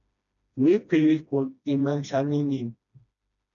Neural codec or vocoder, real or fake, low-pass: codec, 16 kHz, 1 kbps, FreqCodec, smaller model; fake; 7.2 kHz